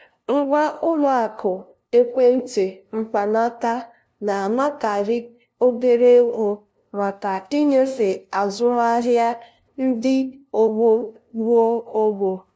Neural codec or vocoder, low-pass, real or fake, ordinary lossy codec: codec, 16 kHz, 0.5 kbps, FunCodec, trained on LibriTTS, 25 frames a second; none; fake; none